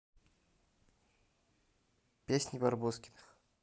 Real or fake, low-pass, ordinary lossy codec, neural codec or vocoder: real; none; none; none